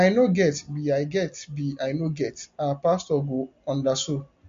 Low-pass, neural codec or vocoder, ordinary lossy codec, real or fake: 7.2 kHz; none; MP3, 48 kbps; real